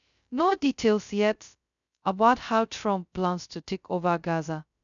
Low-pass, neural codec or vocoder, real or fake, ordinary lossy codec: 7.2 kHz; codec, 16 kHz, 0.2 kbps, FocalCodec; fake; none